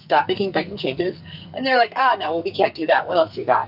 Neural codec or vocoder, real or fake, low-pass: codec, 44.1 kHz, 2.6 kbps, SNAC; fake; 5.4 kHz